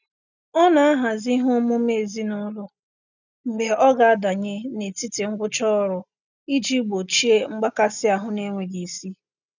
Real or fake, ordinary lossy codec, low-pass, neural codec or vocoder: real; none; 7.2 kHz; none